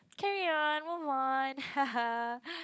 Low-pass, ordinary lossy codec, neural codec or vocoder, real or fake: none; none; codec, 16 kHz, 16 kbps, FunCodec, trained on LibriTTS, 50 frames a second; fake